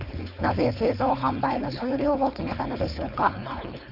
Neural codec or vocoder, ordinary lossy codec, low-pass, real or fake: codec, 16 kHz, 4.8 kbps, FACodec; none; 5.4 kHz; fake